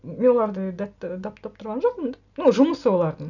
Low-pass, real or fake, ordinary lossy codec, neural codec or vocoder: 7.2 kHz; real; none; none